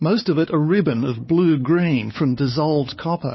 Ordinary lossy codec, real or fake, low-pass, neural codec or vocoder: MP3, 24 kbps; fake; 7.2 kHz; codec, 16 kHz, 4 kbps, X-Codec, WavLM features, trained on Multilingual LibriSpeech